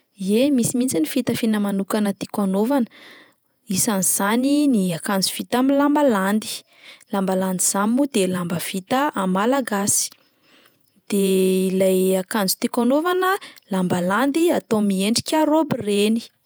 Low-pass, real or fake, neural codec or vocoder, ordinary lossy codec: none; fake; vocoder, 48 kHz, 128 mel bands, Vocos; none